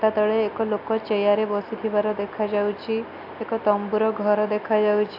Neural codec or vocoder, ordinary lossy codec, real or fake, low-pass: none; AAC, 48 kbps; real; 5.4 kHz